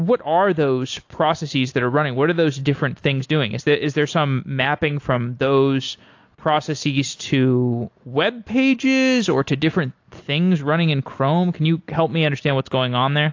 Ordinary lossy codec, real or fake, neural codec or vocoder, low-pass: AAC, 48 kbps; real; none; 7.2 kHz